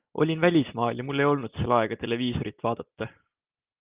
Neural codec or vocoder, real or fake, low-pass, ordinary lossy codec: none; real; 3.6 kHz; Opus, 24 kbps